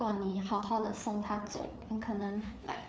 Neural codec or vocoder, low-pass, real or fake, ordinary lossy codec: codec, 16 kHz, 4 kbps, FreqCodec, larger model; none; fake; none